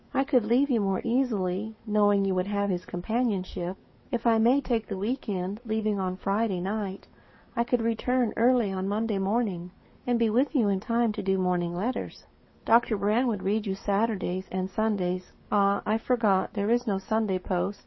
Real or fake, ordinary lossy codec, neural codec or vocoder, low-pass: fake; MP3, 24 kbps; codec, 44.1 kHz, 7.8 kbps, DAC; 7.2 kHz